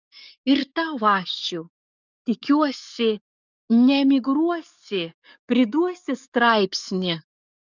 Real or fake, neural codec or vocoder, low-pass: fake; codec, 44.1 kHz, 7.8 kbps, DAC; 7.2 kHz